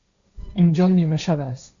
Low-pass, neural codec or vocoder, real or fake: 7.2 kHz; codec, 16 kHz, 1.1 kbps, Voila-Tokenizer; fake